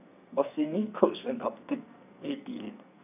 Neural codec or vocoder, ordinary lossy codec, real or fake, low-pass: codec, 44.1 kHz, 2.6 kbps, SNAC; none; fake; 3.6 kHz